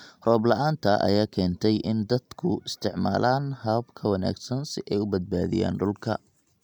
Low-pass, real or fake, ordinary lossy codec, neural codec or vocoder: 19.8 kHz; real; none; none